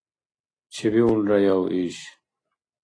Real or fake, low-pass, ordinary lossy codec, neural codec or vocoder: real; 9.9 kHz; AAC, 32 kbps; none